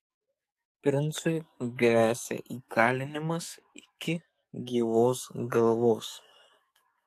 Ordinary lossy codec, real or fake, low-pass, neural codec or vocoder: AAC, 64 kbps; fake; 14.4 kHz; codec, 44.1 kHz, 7.8 kbps, DAC